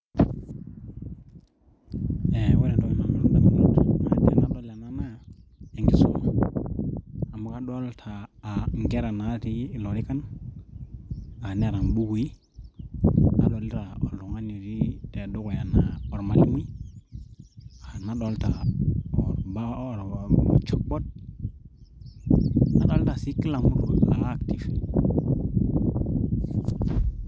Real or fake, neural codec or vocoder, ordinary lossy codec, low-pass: real; none; none; none